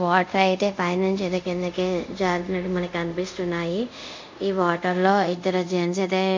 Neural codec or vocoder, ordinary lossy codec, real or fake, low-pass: codec, 24 kHz, 0.5 kbps, DualCodec; MP3, 64 kbps; fake; 7.2 kHz